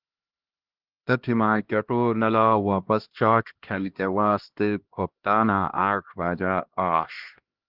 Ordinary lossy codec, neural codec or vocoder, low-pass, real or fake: Opus, 16 kbps; codec, 16 kHz, 1 kbps, X-Codec, HuBERT features, trained on LibriSpeech; 5.4 kHz; fake